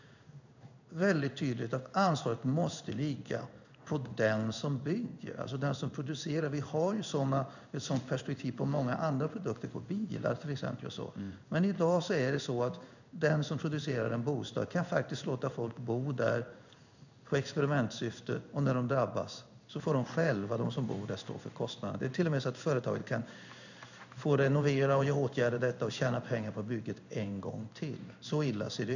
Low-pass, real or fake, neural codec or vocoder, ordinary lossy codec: 7.2 kHz; fake; codec, 16 kHz in and 24 kHz out, 1 kbps, XY-Tokenizer; none